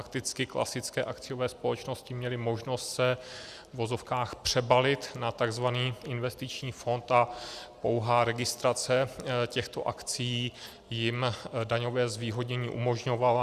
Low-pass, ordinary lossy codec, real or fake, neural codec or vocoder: 14.4 kHz; Opus, 64 kbps; real; none